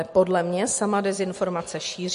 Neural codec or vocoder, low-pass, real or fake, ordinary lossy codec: none; 14.4 kHz; real; MP3, 48 kbps